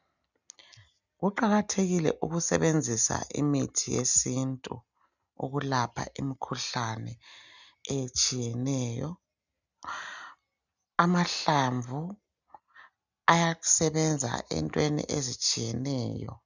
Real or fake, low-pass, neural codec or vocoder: real; 7.2 kHz; none